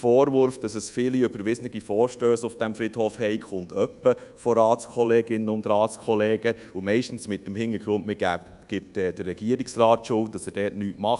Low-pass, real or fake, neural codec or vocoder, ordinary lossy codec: 10.8 kHz; fake; codec, 24 kHz, 1.2 kbps, DualCodec; none